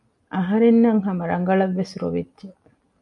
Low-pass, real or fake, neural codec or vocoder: 10.8 kHz; real; none